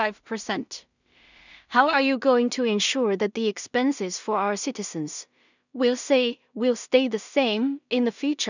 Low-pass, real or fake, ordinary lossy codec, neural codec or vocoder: 7.2 kHz; fake; none; codec, 16 kHz in and 24 kHz out, 0.4 kbps, LongCat-Audio-Codec, two codebook decoder